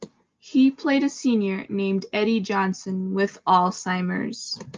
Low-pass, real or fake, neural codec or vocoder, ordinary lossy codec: 7.2 kHz; real; none; Opus, 32 kbps